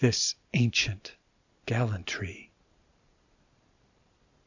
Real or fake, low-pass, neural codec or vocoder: real; 7.2 kHz; none